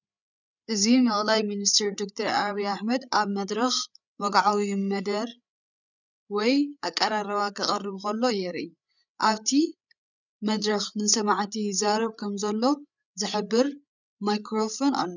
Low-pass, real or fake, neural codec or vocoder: 7.2 kHz; fake; codec, 16 kHz, 8 kbps, FreqCodec, larger model